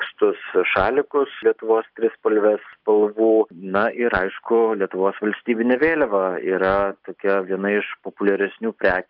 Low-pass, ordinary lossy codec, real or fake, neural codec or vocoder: 5.4 kHz; AAC, 48 kbps; real; none